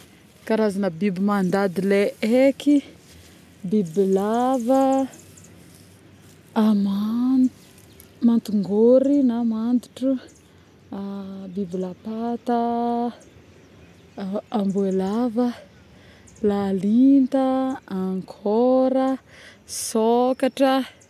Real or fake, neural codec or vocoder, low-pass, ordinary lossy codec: real; none; 14.4 kHz; none